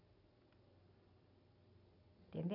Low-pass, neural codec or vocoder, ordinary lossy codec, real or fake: 5.4 kHz; none; none; real